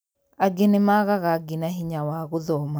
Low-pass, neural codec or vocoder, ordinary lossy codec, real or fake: none; none; none; real